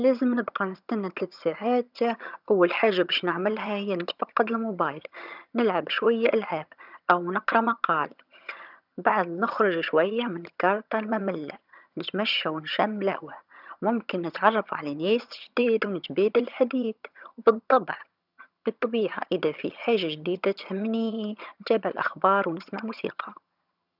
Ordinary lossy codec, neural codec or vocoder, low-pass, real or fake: none; vocoder, 22.05 kHz, 80 mel bands, HiFi-GAN; 5.4 kHz; fake